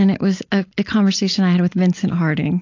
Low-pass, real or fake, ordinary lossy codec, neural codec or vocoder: 7.2 kHz; real; AAC, 48 kbps; none